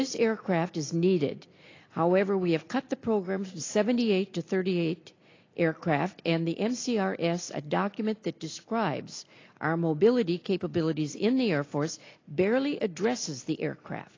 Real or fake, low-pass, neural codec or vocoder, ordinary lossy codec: real; 7.2 kHz; none; AAC, 32 kbps